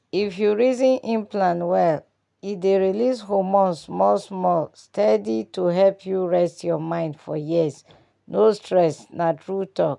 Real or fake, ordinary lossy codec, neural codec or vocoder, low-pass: real; MP3, 96 kbps; none; 10.8 kHz